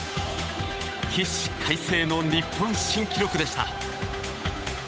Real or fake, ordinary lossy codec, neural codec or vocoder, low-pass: fake; none; codec, 16 kHz, 8 kbps, FunCodec, trained on Chinese and English, 25 frames a second; none